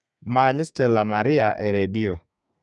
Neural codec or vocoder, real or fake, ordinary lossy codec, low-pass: codec, 32 kHz, 1.9 kbps, SNAC; fake; none; 10.8 kHz